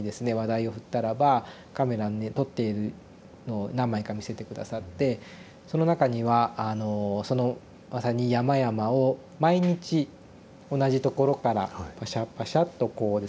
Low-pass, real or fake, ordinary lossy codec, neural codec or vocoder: none; real; none; none